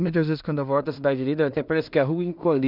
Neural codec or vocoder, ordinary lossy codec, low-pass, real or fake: codec, 16 kHz in and 24 kHz out, 0.4 kbps, LongCat-Audio-Codec, two codebook decoder; none; 5.4 kHz; fake